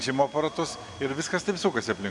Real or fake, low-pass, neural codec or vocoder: real; 10.8 kHz; none